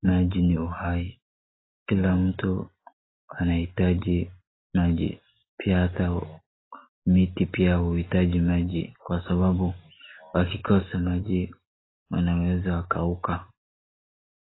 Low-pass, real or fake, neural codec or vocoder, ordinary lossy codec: 7.2 kHz; real; none; AAC, 16 kbps